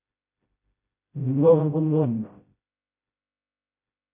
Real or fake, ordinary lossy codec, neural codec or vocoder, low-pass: fake; MP3, 24 kbps; codec, 16 kHz, 0.5 kbps, FreqCodec, smaller model; 3.6 kHz